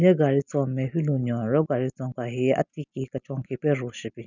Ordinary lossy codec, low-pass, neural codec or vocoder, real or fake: none; 7.2 kHz; none; real